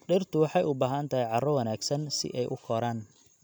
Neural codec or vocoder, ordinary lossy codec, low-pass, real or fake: none; none; none; real